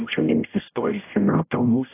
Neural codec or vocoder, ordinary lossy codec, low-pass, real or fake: codec, 16 kHz, 0.5 kbps, X-Codec, HuBERT features, trained on general audio; AAC, 24 kbps; 3.6 kHz; fake